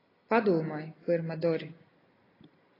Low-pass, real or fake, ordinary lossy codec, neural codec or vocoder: 5.4 kHz; real; AAC, 24 kbps; none